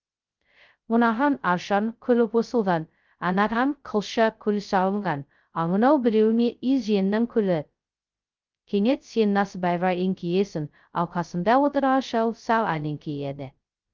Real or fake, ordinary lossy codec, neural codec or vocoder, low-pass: fake; Opus, 24 kbps; codec, 16 kHz, 0.2 kbps, FocalCodec; 7.2 kHz